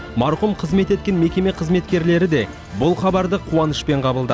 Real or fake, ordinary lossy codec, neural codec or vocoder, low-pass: real; none; none; none